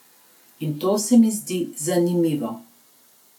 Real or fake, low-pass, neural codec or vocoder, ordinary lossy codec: real; 19.8 kHz; none; none